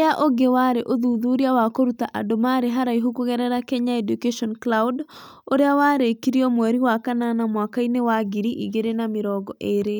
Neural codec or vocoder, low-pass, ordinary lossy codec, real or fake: none; none; none; real